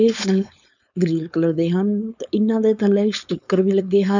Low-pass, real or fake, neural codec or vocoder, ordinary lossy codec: 7.2 kHz; fake; codec, 16 kHz, 4.8 kbps, FACodec; none